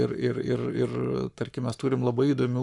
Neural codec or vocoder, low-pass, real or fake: codec, 44.1 kHz, 7.8 kbps, Pupu-Codec; 10.8 kHz; fake